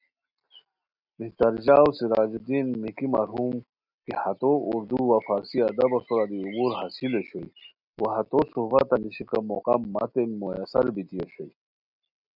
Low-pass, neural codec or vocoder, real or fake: 5.4 kHz; none; real